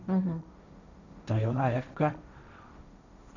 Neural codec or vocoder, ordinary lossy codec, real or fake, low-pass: codec, 16 kHz, 1.1 kbps, Voila-Tokenizer; none; fake; 7.2 kHz